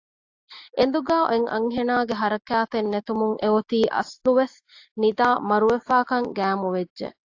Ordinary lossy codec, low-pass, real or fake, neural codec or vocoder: AAC, 48 kbps; 7.2 kHz; real; none